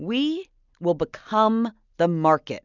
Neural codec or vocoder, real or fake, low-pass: none; real; 7.2 kHz